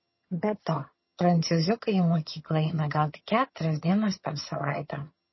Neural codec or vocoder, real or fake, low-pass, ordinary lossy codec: vocoder, 22.05 kHz, 80 mel bands, HiFi-GAN; fake; 7.2 kHz; MP3, 24 kbps